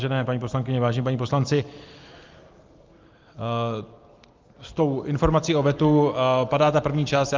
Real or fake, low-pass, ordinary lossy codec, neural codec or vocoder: real; 7.2 kHz; Opus, 32 kbps; none